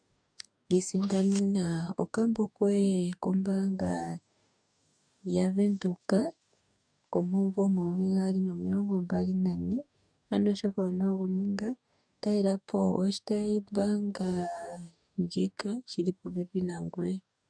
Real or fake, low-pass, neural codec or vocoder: fake; 9.9 kHz; codec, 44.1 kHz, 2.6 kbps, DAC